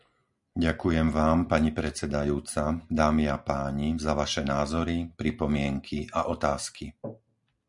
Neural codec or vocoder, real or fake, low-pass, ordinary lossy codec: none; real; 10.8 kHz; MP3, 96 kbps